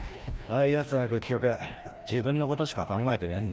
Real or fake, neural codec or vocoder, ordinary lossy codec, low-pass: fake; codec, 16 kHz, 1 kbps, FreqCodec, larger model; none; none